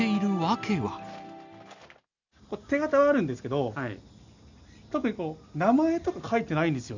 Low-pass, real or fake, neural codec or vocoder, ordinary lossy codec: 7.2 kHz; real; none; none